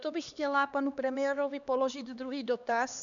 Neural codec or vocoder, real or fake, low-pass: codec, 16 kHz, 2 kbps, X-Codec, HuBERT features, trained on LibriSpeech; fake; 7.2 kHz